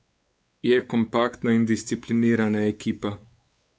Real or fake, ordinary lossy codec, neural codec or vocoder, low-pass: fake; none; codec, 16 kHz, 4 kbps, X-Codec, WavLM features, trained on Multilingual LibriSpeech; none